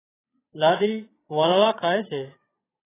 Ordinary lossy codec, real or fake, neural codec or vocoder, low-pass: AAC, 16 kbps; fake; vocoder, 22.05 kHz, 80 mel bands, Vocos; 3.6 kHz